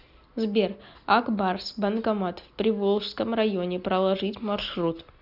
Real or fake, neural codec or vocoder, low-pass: real; none; 5.4 kHz